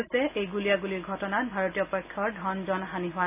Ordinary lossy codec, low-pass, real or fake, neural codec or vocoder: none; 3.6 kHz; real; none